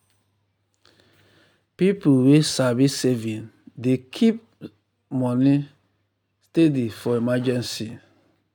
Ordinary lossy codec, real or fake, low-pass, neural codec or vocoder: none; real; 19.8 kHz; none